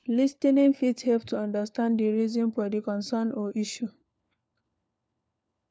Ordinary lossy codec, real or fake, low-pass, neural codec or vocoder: none; fake; none; codec, 16 kHz, 4 kbps, FunCodec, trained on LibriTTS, 50 frames a second